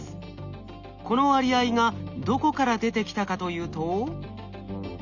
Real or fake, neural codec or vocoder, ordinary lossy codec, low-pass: real; none; none; 7.2 kHz